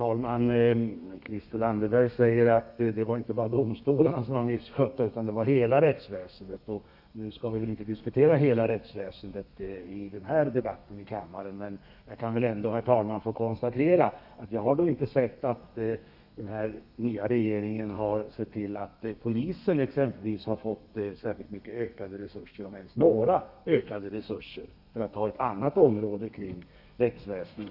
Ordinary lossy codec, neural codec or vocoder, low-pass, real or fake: none; codec, 32 kHz, 1.9 kbps, SNAC; 5.4 kHz; fake